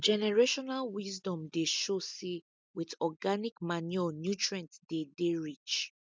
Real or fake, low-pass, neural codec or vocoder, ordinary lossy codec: real; none; none; none